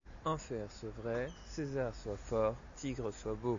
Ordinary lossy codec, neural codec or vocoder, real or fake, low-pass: AAC, 48 kbps; none; real; 7.2 kHz